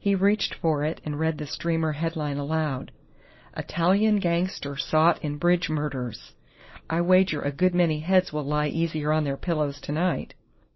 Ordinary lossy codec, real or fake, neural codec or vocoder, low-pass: MP3, 24 kbps; real; none; 7.2 kHz